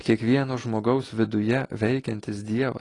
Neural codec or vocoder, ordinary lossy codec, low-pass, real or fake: none; AAC, 32 kbps; 10.8 kHz; real